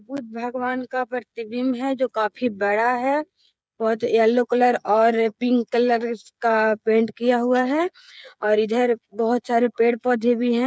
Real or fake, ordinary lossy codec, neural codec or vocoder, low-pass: fake; none; codec, 16 kHz, 16 kbps, FreqCodec, smaller model; none